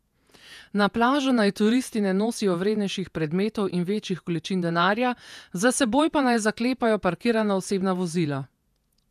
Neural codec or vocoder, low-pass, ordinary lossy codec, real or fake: vocoder, 48 kHz, 128 mel bands, Vocos; 14.4 kHz; none; fake